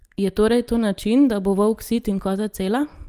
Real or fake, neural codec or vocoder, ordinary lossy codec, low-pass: real; none; Opus, 32 kbps; 14.4 kHz